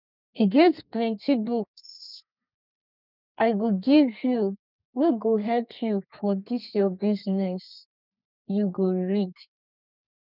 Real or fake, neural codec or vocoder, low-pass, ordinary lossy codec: fake; codec, 32 kHz, 1.9 kbps, SNAC; 5.4 kHz; none